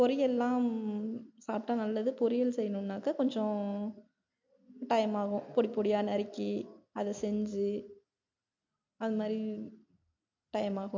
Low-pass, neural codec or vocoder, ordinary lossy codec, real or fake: 7.2 kHz; none; MP3, 48 kbps; real